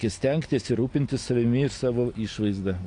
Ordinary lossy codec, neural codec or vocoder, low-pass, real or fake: AAC, 48 kbps; none; 9.9 kHz; real